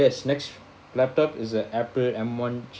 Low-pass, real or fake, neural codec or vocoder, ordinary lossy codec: none; real; none; none